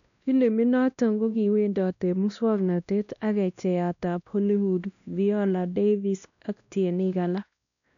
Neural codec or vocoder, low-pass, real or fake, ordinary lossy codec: codec, 16 kHz, 1 kbps, X-Codec, WavLM features, trained on Multilingual LibriSpeech; 7.2 kHz; fake; none